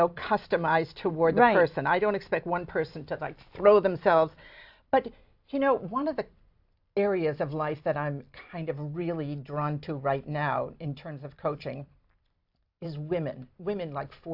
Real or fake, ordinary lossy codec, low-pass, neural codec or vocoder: fake; AAC, 48 kbps; 5.4 kHz; vocoder, 44.1 kHz, 128 mel bands every 256 samples, BigVGAN v2